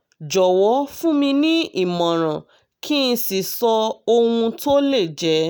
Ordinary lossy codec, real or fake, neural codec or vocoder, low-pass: none; real; none; none